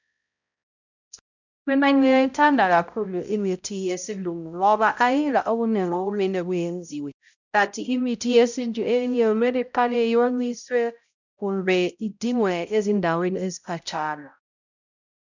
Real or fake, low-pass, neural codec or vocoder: fake; 7.2 kHz; codec, 16 kHz, 0.5 kbps, X-Codec, HuBERT features, trained on balanced general audio